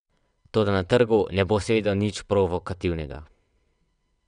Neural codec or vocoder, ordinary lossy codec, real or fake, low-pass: vocoder, 22.05 kHz, 80 mel bands, WaveNeXt; none; fake; 9.9 kHz